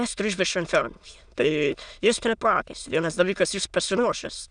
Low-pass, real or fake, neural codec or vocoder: 9.9 kHz; fake; autoencoder, 22.05 kHz, a latent of 192 numbers a frame, VITS, trained on many speakers